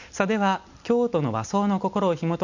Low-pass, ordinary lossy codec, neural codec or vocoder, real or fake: 7.2 kHz; none; none; real